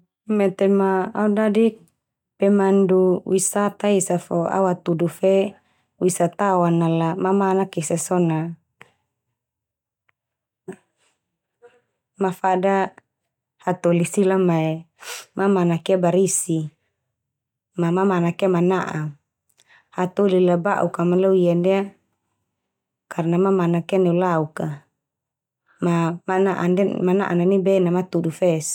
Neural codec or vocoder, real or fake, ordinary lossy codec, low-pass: none; real; none; 19.8 kHz